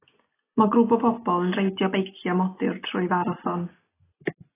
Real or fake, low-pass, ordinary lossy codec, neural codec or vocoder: real; 3.6 kHz; AAC, 16 kbps; none